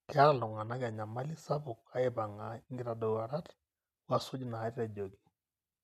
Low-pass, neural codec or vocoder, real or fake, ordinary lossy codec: 14.4 kHz; none; real; none